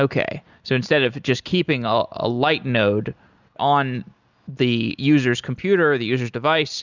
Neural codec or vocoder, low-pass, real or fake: none; 7.2 kHz; real